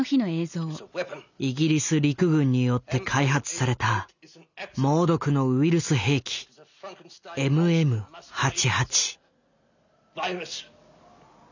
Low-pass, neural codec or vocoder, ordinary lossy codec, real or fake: 7.2 kHz; none; none; real